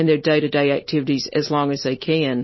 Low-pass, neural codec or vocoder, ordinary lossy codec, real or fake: 7.2 kHz; none; MP3, 24 kbps; real